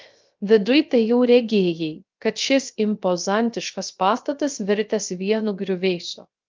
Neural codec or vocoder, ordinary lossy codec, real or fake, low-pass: codec, 16 kHz, 0.3 kbps, FocalCodec; Opus, 24 kbps; fake; 7.2 kHz